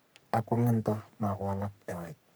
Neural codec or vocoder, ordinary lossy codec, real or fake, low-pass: codec, 44.1 kHz, 3.4 kbps, Pupu-Codec; none; fake; none